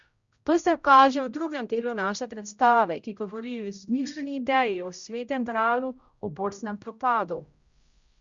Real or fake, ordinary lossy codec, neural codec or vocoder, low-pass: fake; Opus, 64 kbps; codec, 16 kHz, 0.5 kbps, X-Codec, HuBERT features, trained on general audio; 7.2 kHz